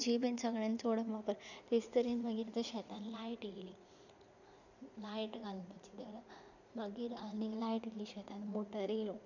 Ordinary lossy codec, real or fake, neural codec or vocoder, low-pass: none; fake; vocoder, 44.1 kHz, 80 mel bands, Vocos; 7.2 kHz